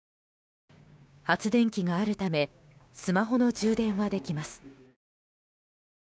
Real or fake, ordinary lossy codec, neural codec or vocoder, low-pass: fake; none; codec, 16 kHz, 6 kbps, DAC; none